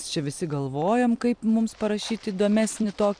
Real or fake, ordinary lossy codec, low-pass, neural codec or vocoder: fake; MP3, 96 kbps; 9.9 kHz; vocoder, 44.1 kHz, 128 mel bands every 256 samples, BigVGAN v2